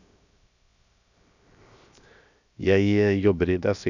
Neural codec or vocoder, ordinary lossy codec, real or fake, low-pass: codec, 16 kHz, 0.7 kbps, FocalCodec; none; fake; 7.2 kHz